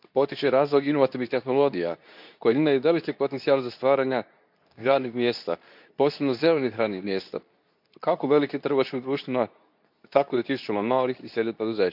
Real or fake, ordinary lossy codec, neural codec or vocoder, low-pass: fake; none; codec, 24 kHz, 0.9 kbps, WavTokenizer, medium speech release version 2; 5.4 kHz